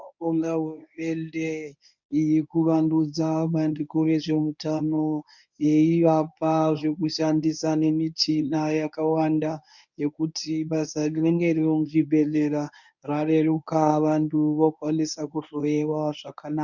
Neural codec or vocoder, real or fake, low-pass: codec, 24 kHz, 0.9 kbps, WavTokenizer, medium speech release version 1; fake; 7.2 kHz